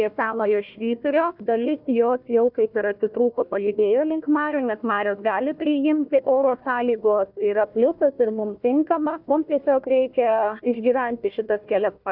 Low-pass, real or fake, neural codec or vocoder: 5.4 kHz; fake; codec, 16 kHz, 1 kbps, FunCodec, trained on Chinese and English, 50 frames a second